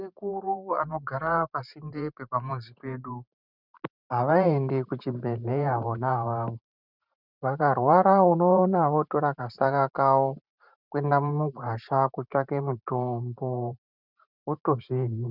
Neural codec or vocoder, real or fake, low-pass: vocoder, 44.1 kHz, 128 mel bands every 512 samples, BigVGAN v2; fake; 5.4 kHz